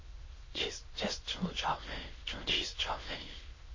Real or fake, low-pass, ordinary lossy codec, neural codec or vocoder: fake; 7.2 kHz; MP3, 32 kbps; autoencoder, 22.05 kHz, a latent of 192 numbers a frame, VITS, trained on many speakers